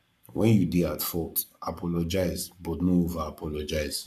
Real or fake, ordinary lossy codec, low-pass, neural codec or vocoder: fake; none; 14.4 kHz; codec, 44.1 kHz, 7.8 kbps, Pupu-Codec